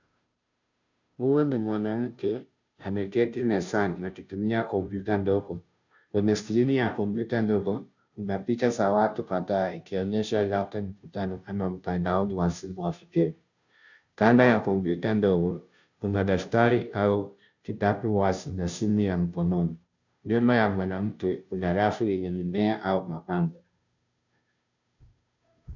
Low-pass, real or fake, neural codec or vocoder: 7.2 kHz; fake; codec, 16 kHz, 0.5 kbps, FunCodec, trained on Chinese and English, 25 frames a second